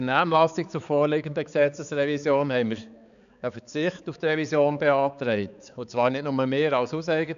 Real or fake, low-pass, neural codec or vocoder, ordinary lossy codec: fake; 7.2 kHz; codec, 16 kHz, 4 kbps, X-Codec, HuBERT features, trained on balanced general audio; MP3, 96 kbps